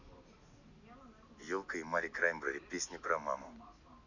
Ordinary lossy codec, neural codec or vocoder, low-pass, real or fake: none; none; 7.2 kHz; real